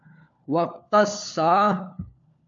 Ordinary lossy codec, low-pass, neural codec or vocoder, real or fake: AAC, 64 kbps; 7.2 kHz; codec, 16 kHz, 4 kbps, FunCodec, trained on LibriTTS, 50 frames a second; fake